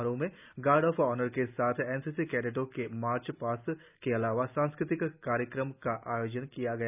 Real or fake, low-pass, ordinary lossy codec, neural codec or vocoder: real; 3.6 kHz; none; none